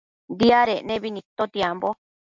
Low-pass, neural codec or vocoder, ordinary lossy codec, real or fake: 7.2 kHz; none; MP3, 64 kbps; real